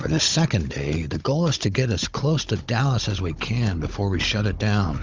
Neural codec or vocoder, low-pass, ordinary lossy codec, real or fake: codec, 16 kHz, 16 kbps, FunCodec, trained on Chinese and English, 50 frames a second; 7.2 kHz; Opus, 32 kbps; fake